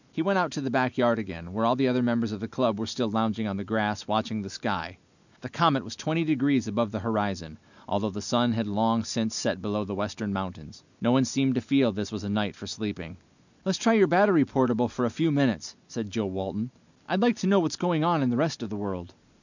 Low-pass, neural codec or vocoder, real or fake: 7.2 kHz; none; real